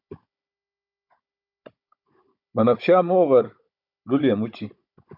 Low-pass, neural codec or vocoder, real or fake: 5.4 kHz; codec, 16 kHz, 16 kbps, FunCodec, trained on Chinese and English, 50 frames a second; fake